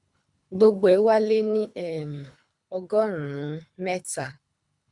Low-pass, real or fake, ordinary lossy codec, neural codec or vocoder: 10.8 kHz; fake; none; codec, 24 kHz, 3 kbps, HILCodec